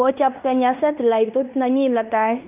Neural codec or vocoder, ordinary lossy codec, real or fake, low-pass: codec, 16 kHz in and 24 kHz out, 0.9 kbps, LongCat-Audio-Codec, fine tuned four codebook decoder; none; fake; 3.6 kHz